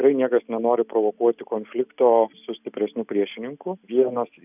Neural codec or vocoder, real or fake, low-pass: none; real; 3.6 kHz